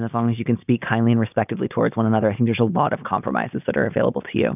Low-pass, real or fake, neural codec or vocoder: 3.6 kHz; real; none